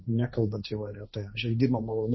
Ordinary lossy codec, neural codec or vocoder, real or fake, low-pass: MP3, 24 kbps; codec, 16 kHz, 1.1 kbps, Voila-Tokenizer; fake; 7.2 kHz